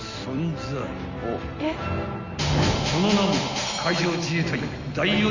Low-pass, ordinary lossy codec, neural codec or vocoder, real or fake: 7.2 kHz; Opus, 64 kbps; none; real